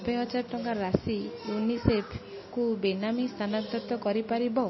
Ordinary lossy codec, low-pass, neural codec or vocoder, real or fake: MP3, 24 kbps; 7.2 kHz; none; real